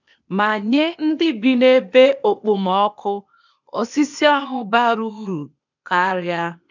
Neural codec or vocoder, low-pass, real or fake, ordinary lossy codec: codec, 16 kHz, 0.8 kbps, ZipCodec; 7.2 kHz; fake; none